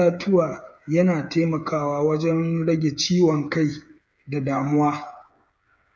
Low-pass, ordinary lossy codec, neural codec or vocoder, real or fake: none; none; codec, 16 kHz, 8 kbps, FreqCodec, smaller model; fake